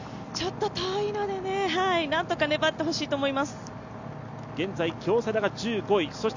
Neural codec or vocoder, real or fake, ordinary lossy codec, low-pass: none; real; none; 7.2 kHz